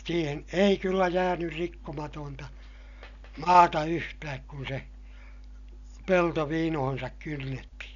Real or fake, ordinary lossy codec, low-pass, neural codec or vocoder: real; none; 7.2 kHz; none